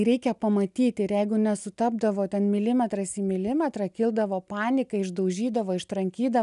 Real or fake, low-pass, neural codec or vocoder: real; 10.8 kHz; none